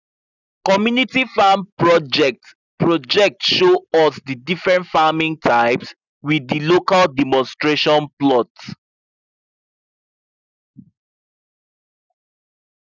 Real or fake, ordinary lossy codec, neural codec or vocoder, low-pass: real; none; none; 7.2 kHz